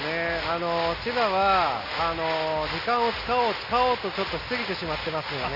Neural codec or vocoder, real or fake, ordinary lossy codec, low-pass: none; real; Opus, 64 kbps; 5.4 kHz